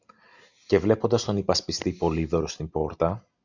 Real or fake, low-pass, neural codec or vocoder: real; 7.2 kHz; none